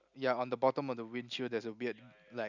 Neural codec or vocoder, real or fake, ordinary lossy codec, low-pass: none; real; none; 7.2 kHz